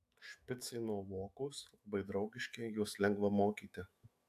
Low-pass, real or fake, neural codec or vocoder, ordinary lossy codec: 14.4 kHz; fake; autoencoder, 48 kHz, 128 numbers a frame, DAC-VAE, trained on Japanese speech; AAC, 64 kbps